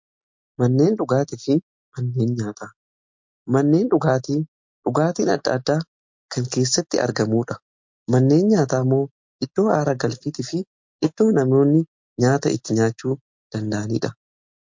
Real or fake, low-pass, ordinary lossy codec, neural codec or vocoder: real; 7.2 kHz; MP3, 48 kbps; none